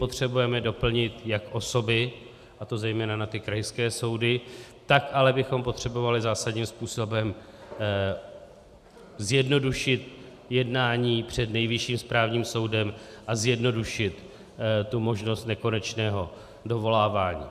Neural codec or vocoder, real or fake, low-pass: vocoder, 44.1 kHz, 128 mel bands every 512 samples, BigVGAN v2; fake; 14.4 kHz